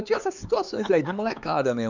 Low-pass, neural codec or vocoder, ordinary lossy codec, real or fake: 7.2 kHz; codec, 16 kHz, 4 kbps, X-Codec, HuBERT features, trained on LibriSpeech; none; fake